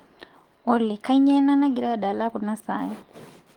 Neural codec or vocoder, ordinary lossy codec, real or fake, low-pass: codec, 44.1 kHz, 7.8 kbps, DAC; Opus, 24 kbps; fake; 19.8 kHz